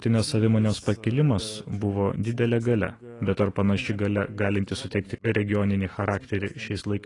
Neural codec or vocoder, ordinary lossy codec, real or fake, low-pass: autoencoder, 48 kHz, 128 numbers a frame, DAC-VAE, trained on Japanese speech; AAC, 32 kbps; fake; 10.8 kHz